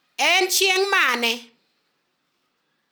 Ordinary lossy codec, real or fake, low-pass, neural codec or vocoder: none; real; none; none